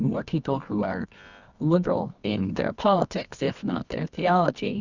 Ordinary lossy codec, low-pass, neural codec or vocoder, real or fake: Opus, 64 kbps; 7.2 kHz; codec, 24 kHz, 0.9 kbps, WavTokenizer, medium music audio release; fake